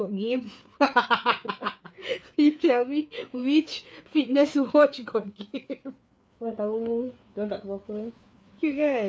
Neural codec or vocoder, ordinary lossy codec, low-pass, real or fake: codec, 16 kHz, 4 kbps, FreqCodec, larger model; none; none; fake